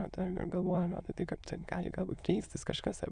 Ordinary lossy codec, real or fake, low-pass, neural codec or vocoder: MP3, 96 kbps; fake; 9.9 kHz; autoencoder, 22.05 kHz, a latent of 192 numbers a frame, VITS, trained on many speakers